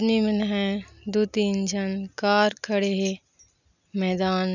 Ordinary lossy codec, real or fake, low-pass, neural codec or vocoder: none; real; 7.2 kHz; none